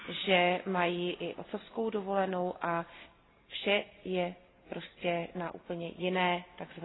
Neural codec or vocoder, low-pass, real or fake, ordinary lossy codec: none; 7.2 kHz; real; AAC, 16 kbps